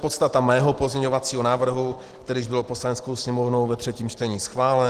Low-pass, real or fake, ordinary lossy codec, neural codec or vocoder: 14.4 kHz; real; Opus, 16 kbps; none